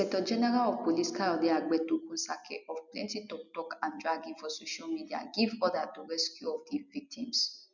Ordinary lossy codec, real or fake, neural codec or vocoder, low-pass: none; real; none; 7.2 kHz